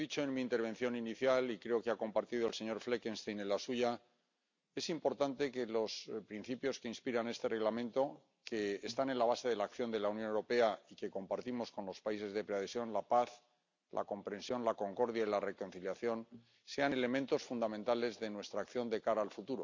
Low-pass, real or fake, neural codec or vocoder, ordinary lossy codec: 7.2 kHz; real; none; MP3, 64 kbps